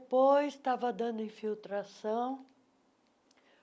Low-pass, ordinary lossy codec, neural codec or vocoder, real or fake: none; none; none; real